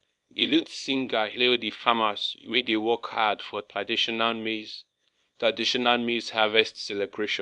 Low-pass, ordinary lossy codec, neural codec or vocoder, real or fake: 10.8 kHz; none; codec, 24 kHz, 0.9 kbps, WavTokenizer, small release; fake